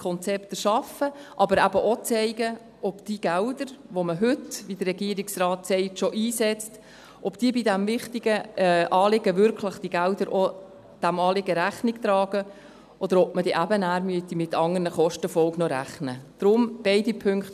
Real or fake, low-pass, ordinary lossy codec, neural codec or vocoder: real; 14.4 kHz; none; none